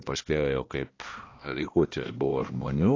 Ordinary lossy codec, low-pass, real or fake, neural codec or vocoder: AAC, 32 kbps; 7.2 kHz; fake; codec, 16 kHz, 1 kbps, X-Codec, HuBERT features, trained on balanced general audio